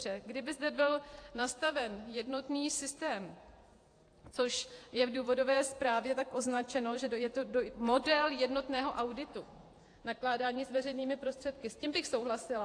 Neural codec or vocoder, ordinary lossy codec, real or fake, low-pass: vocoder, 48 kHz, 128 mel bands, Vocos; AAC, 48 kbps; fake; 9.9 kHz